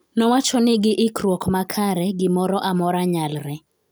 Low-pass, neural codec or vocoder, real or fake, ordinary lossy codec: none; none; real; none